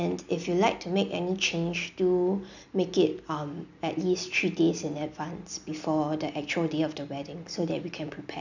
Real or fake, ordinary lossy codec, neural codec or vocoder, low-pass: real; none; none; 7.2 kHz